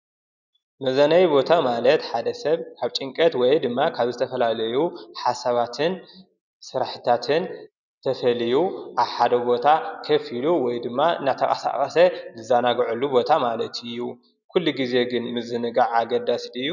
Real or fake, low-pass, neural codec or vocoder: real; 7.2 kHz; none